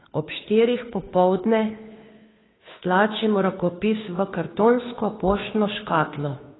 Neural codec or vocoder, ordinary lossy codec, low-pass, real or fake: codec, 16 kHz, 2 kbps, FunCodec, trained on Chinese and English, 25 frames a second; AAC, 16 kbps; 7.2 kHz; fake